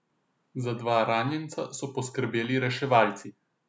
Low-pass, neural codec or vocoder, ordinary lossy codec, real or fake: none; none; none; real